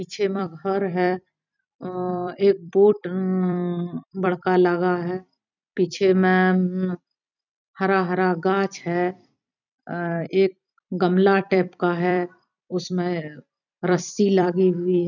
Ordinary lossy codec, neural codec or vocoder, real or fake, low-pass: none; vocoder, 44.1 kHz, 128 mel bands every 256 samples, BigVGAN v2; fake; 7.2 kHz